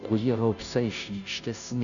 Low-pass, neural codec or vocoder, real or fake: 7.2 kHz; codec, 16 kHz, 0.5 kbps, FunCodec, trained on Chinese and English, 25 frames a second; fake